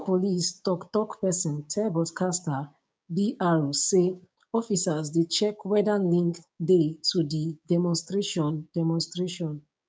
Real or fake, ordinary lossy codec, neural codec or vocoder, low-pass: fake; none; codec, 16 kHz, 6 kbps, DAC; none